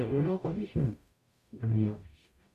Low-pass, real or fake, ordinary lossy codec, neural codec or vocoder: 14.4 kHz; fake; AAC, 96 kbps; codec, 44.1 kHz, 0.9 kbps, DAC